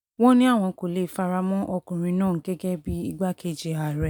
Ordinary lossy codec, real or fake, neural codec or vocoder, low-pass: none; real; none; 19.8 kHz